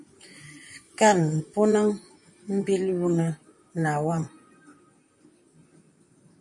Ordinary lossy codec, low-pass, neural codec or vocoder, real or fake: MP3, 48 kbps; 10.8 kHz; vocoder, 44.1 kHz, 128 mel bands, Pupu-Vocoder; fake